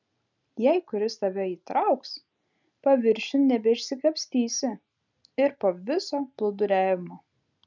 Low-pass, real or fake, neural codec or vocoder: 7.2 kHz; real; none